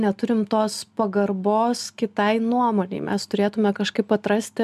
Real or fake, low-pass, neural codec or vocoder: real; 14.4 kHz; none